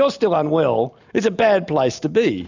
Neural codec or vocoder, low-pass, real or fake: none; 7.2 kHz; real